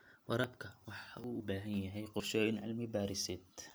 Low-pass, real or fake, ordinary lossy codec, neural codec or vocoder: none; real; none; none